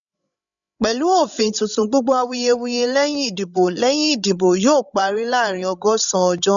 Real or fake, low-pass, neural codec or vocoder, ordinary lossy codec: fake; 7.2 kHz; codec, 16 kHz, 16 kbps, FreqCodec, larger model; none